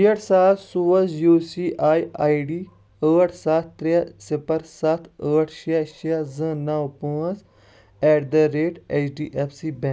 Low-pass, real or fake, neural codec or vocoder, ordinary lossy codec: none; real; none; none